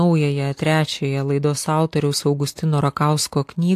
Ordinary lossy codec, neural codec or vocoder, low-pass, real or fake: AAC, 64 kbps; none; 14.4 kHz; real